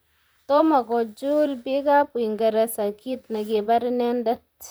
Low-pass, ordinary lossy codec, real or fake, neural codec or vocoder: none; none; fake; vocoder, 44.1 kHz, 128 mel bands, Pupu-Vocoder